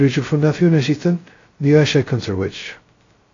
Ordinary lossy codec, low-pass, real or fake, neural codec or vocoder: AAC, 32 kbps; 7.2 kHz; fake; codec, 16 kHz, 0.2 kbps, FocalCodec